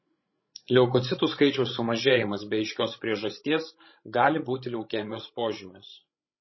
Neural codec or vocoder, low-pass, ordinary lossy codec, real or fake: codec, 16 kHz, 16 kbps, FreqCodec, larger model; 7.2 kHz; MP3, 24 kbps; fake